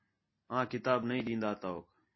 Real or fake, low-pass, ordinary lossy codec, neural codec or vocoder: real; 7.2 kHz; MP3, 24 kbps; none